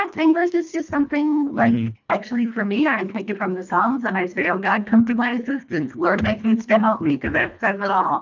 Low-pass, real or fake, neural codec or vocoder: 7.2 kHz; fake; codec, 24 kHz, 1.5 kbps, HILCodec